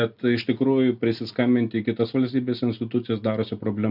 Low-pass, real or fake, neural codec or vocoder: 5.4 kHz; real; none